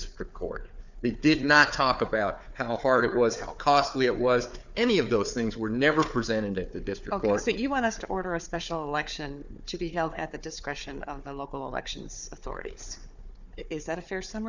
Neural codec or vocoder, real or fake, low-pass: codec, 16 kHz, 4 kbps, FunCodec, trained on Chinese and English, 50 frames a second; fake; 7.2 kHz